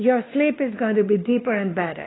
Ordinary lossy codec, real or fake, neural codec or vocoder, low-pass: AAC, 16 kbps; fake; codec, 16 kHz, 2 kbps, X-Codec, WavLM features, trained on Multilingual LibriSpeech; 7.2 kHz